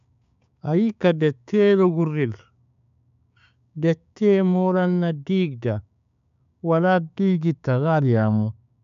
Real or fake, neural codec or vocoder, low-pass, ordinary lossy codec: fake; codec, 16 kHz, 6 kbps, DAC; 7.2 kHz; AAC, 96 kbps